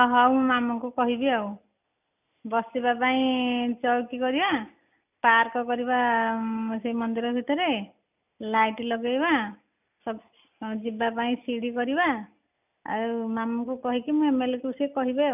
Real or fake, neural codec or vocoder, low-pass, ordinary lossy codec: real; none; 3.6 kHz; none